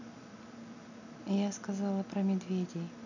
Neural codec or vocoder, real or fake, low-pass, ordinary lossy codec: none; real; 7.2 kHz; none